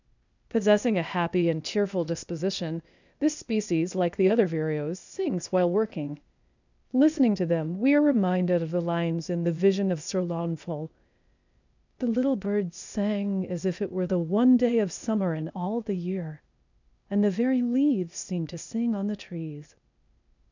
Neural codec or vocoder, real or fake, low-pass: codec, 16 kHz, 0.8 kbps, ZipCodec; fake; 7.2 kHz